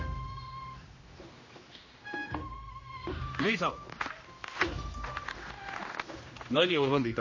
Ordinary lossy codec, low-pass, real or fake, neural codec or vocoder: MP3, 32 kbps; 7.2 kHz; fake; codec, 16 kHz, 1 kbps, X-Codec, HuBERT features, trained on general audio